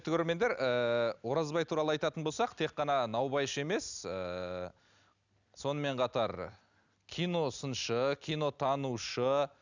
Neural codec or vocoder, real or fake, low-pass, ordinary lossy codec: none; real; 7.2 kHz; none